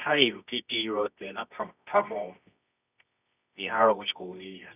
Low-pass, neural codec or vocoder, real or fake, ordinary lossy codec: 3.6 kHz; codec, 24 kHz, 0.9 kbps, WavTokenizer, medium music audio release; fake; none